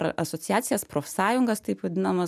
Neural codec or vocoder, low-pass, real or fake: none; 14.4 kHz; real